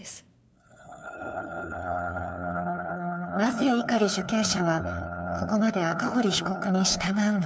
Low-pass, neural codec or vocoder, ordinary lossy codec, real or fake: none; codec, 16 kHz, 2 kbps, FunCodec, trained on LibriTTS, 25 frames a second; none; fake